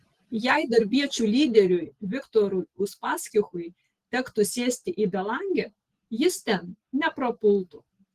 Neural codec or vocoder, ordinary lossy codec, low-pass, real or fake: none; Opus, 16 kbps; 14.4 kHz; real